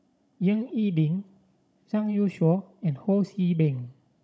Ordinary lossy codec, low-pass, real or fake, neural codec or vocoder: none; none; fake; codec, 16 kHz, 16 kbps, FunCodec, trained on Chinese and English, 50 frames a second